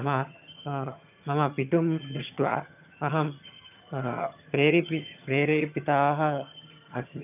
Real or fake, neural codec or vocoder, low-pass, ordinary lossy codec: fake; vocoder, 22.05 kHz, 80 mel bands, HiFi-GAN; 3.6 kHz; none